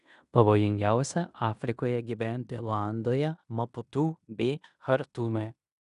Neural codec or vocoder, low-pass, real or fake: codec, 16 kHz in and 24 kHz out, 0.9 kbps, LongCat-Audio-Codec, fine tuned four codebook decoder; 10.8 kHz; fake